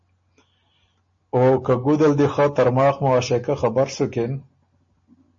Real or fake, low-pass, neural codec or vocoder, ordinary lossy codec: real; 7.2 kHz; none; MP3, 32 kbps